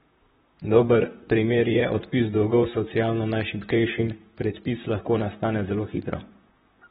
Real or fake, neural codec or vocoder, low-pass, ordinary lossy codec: fake; vocoder, 44.1 kHz, 128 mel bands, Pupu-Vocoder; 19.8 kHz; AAC, 16 kbps